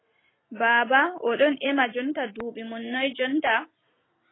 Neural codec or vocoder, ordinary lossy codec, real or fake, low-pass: none; AAC, 16 kbps; real; 7.2 kHz